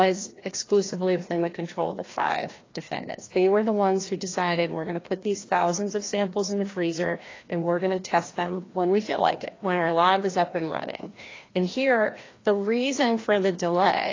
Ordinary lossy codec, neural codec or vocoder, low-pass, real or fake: AAC, 32 kbps; codec, 16 kHz, 1 kbps, FreqCodec, larger model; 7.2 kHz; fake